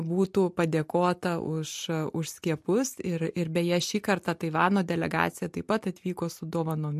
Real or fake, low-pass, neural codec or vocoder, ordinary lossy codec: real; 14.4 kHz; none; MP3, 64 kbps